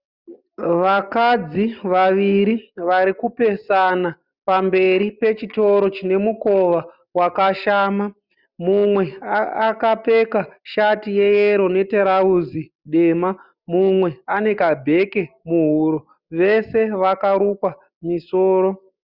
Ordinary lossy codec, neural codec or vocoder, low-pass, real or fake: Opus, 64 kbps; none; 5.4 kHz; real